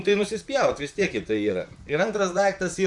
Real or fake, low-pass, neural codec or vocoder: fake; 10.8 kHz; vocoder, 44.1 kHz, 128 mel bands, Pupu-Vocoder